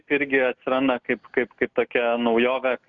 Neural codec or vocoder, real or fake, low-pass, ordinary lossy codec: none; real; 7.2 kHz; Opus, 64 kbps